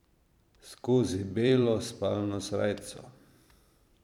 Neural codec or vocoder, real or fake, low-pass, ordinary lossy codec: vocoder, 44.1 kHz, 128 mel bands every 512 samples, BigVGAN v2; fake; 19.8 kHz; none